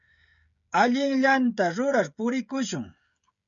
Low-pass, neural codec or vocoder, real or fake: 7.2 kHz; codec, 16 kHz, 16 kbps, FreqCodec, smaller model; fake